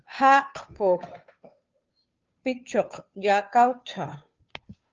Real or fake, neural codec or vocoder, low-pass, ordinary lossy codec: fake; codec, 16 kHz, 2 kbps, FunCodec, trained on Chinese and English, 25 frames a second; 7.2 kHz; Opus, 24 kbps